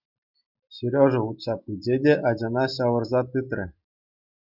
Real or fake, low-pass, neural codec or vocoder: real; 5.4 kHz; none